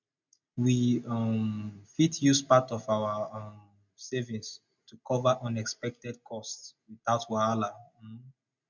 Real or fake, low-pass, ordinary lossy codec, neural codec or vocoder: real; 7.2 kHz; none; none